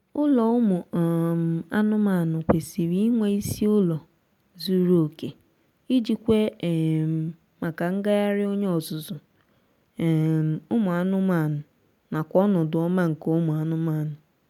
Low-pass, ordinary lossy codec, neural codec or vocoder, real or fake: 19.8 kHz; none; none; real